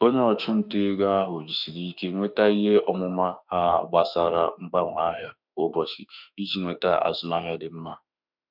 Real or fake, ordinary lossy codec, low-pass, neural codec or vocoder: fake; none; 5.4 kHz; autoencoder, 48 kHz, 32 numbers a frame, DAC-VAE, trained on Japanese speech